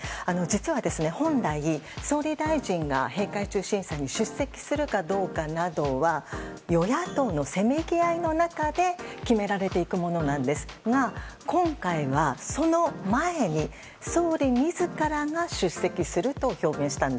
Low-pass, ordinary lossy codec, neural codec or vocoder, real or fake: none; none; none; real